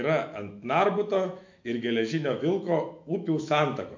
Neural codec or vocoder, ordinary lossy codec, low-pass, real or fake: autoencoder, 48 kHz, 128 numbers a frame, DAC-VAE, trained on Japanese speech; MP3, 48 kbps; 7.2 kHz; fake